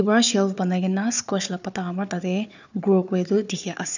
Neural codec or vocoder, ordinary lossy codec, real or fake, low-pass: codec, 16 kHz, 4 kbps, FunCodec, trained on Chinese and English, 50 frames a second; none; fake; 7.2 kHz